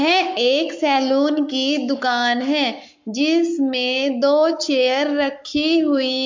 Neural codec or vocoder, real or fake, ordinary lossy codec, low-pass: codec, 44.1 kHz, 7.8 kbps, Pupu-Codec; fake; MP3, 48 kbps; 7.2 kHz